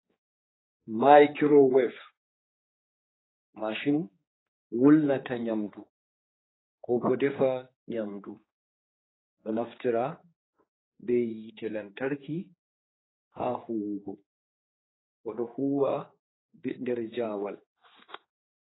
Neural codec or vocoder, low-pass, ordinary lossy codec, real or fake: codec, 16 kHz, 4 kbps, X-Codec, HuBERT features, trained on general audio; 7.2 kHz; AAC, 16 kbps; fake